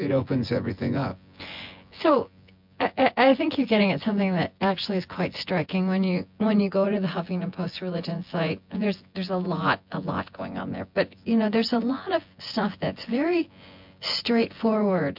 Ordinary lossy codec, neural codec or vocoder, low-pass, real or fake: MP3, 48 kbps; vocoder, 24 kHz, 100 mel bands, Vocos; 5.4 kHz; fake